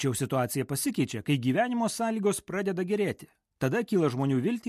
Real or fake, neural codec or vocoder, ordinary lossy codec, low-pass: real; none; MP3, 64 kbps; 14.4 kHz